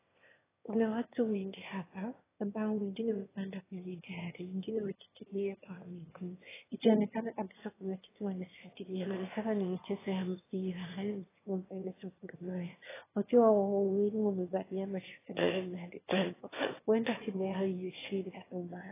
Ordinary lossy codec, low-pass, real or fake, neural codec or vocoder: AAC, 16 kbps; 3.6 kHz; fake; autoencoder, 22.05 kHz, a latent of 192 numbers a frame, VITS, trained on one speaker